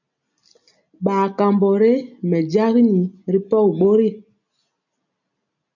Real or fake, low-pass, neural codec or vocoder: real; 7.2 kHz; none